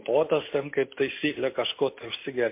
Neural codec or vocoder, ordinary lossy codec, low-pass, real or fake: none; MP3, 24 kbps; 3.6 kHz; real